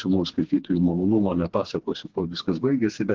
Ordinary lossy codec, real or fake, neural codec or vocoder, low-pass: Opus, 16 kbps; fake; codec, 16 kHz, 2 kbps, FreqCodec, smaller model; 7.2 kHz